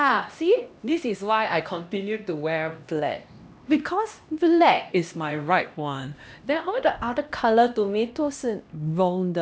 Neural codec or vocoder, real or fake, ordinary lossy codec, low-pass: codec, 16 kHz, 1 kbps, X-Codec, HuBERT features, trained on LibriSpeech; fake; none; none